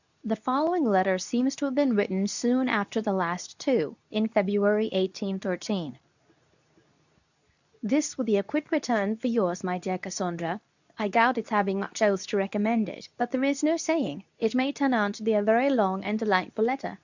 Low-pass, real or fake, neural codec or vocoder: 7.2 kHz; fake; codec, 24 kHz, 0.9 kbps, WavTokenizer, medium speech release version 2